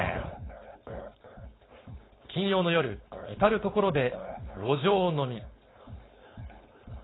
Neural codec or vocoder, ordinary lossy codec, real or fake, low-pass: codec, 16 kHz, 4.8 kbps, FACodec; AAC, 16 kbps; fake; 7.2 kHz